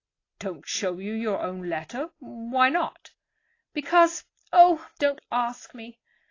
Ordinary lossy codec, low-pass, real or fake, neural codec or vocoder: AAC, 32 kbps; 7.2 kHz; real; none